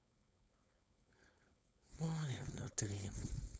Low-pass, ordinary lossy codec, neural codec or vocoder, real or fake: none; none; codec, 16 kHz, 4.8 kbps, FACodec; fake